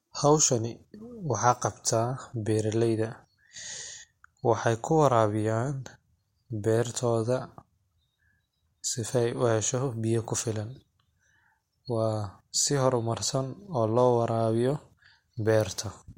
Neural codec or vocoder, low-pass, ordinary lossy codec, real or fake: none; 19.8 kHz; MP3, 64 kbps; real